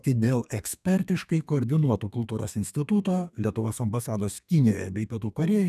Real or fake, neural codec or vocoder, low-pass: fake; codec, 32 kHz, 1.9 kbps, SNAC; 14.4 kHz